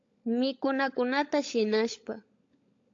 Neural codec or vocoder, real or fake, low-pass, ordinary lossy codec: codec, 16 kHz, 16 kbps, FunCodec, trained on LibriTTS, 50 frames a second; fake; 7.2 kHz; AAC, 48 kbps